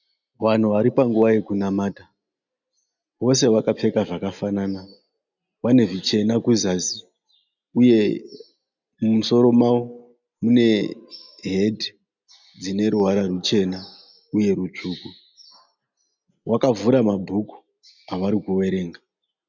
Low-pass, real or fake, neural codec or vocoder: 7.2 kHz; real; none